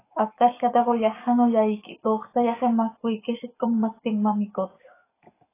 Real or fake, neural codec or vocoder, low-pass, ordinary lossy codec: fake; codec, 44.1 kHz, 7.8 kbps, DAC; 3.6 kHz; AAC, 16 kbps